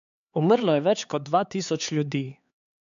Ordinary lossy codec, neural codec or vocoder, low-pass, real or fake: AAC, 96 kbps; codec, 16 kHz, 4 kbps, X-Codec, HuBERT features, trained on LibriSpeech; 7.2 kHz; fake